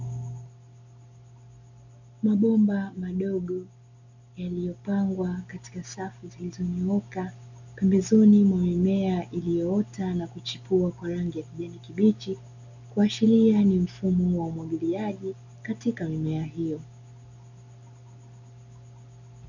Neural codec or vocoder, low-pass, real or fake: none; 7.2 kHz; real